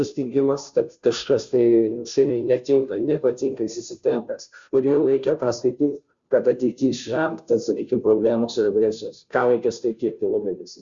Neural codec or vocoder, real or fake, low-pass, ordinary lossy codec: codec, 16 kHz, 0.5 kbps, FunCodec, trained on Chinese and English, 25 frames a second; fake; 7.2 kHz; Opus, 64 kbps